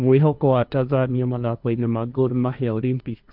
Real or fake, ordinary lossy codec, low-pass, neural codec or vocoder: fake; none; 5.4 kHz; codec, 16 kHz, 1.1 kbps, Voila-Tokenizer